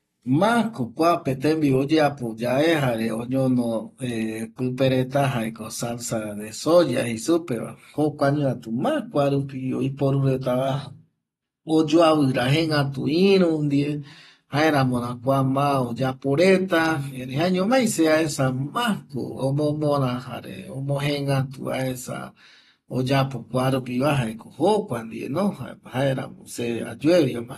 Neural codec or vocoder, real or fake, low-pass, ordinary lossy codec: none; real; 19.8 kHz; AAC, 32 kbps